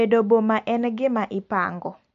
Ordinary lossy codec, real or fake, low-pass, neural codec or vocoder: MP3, 48 kbps; real; 7.2 kHz; none